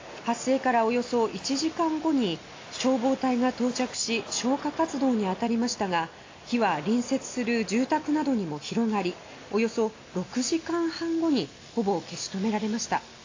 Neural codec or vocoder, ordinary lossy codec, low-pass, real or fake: none; AAC, 32 kbps; 7.2 kHz; real